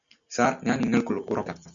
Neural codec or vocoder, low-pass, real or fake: none; 7.2 kHz; real